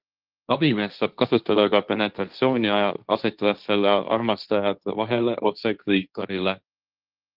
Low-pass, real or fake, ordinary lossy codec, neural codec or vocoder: 5.4 kHz; fake; Opus, 32 kbps; codec, 16 kHz, 1.1 kbps, Voila-Tokenizer